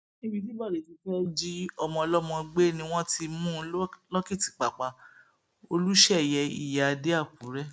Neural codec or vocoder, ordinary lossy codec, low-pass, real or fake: none; none; none; real